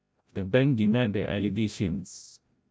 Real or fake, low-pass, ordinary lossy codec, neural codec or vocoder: fake; none; none; codec, 16 kHz, 0.5 kbps, FreqCodec, larger model